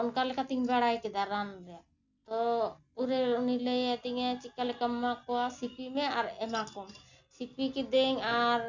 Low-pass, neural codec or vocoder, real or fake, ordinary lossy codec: 7.2 kHz; none; real; none